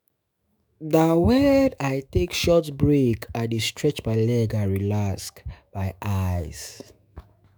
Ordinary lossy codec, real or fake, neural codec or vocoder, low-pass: none; fake; autoencoder, 48 kHz, 128 numbers a frame, DAC-VAE, trained on Japanese speech; none